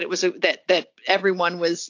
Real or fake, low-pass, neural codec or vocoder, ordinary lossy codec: real; 7.2 kHz; none; AAC, 48 kbps